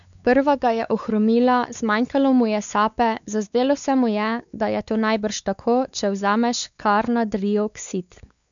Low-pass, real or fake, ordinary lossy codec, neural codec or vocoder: 7.2 kHz; fake; none; codec, 16 kHz, 4 kbps, X-Codec, WavLM features, trained on Multilingual LibriSpeech